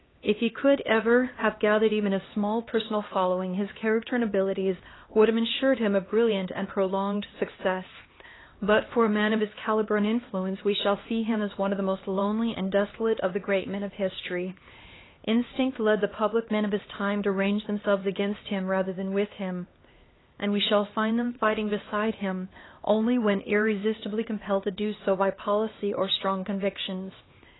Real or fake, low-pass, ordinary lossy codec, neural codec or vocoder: fake; 7.2 kHz; AAC, 16 kbps; codec, 16 kHz, 2 kbps, X-Codec, HuBERT features, trained on LibriSpeech